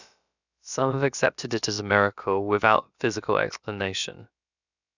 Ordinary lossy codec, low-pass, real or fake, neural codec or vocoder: none; 7.2 kHz; fake; codec, 16 kHz, about 1 kbps, DyCAST, with the encoder's durations